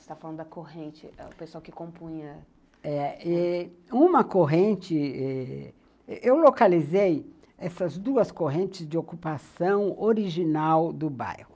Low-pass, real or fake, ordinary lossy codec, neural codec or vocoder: none; real; none; none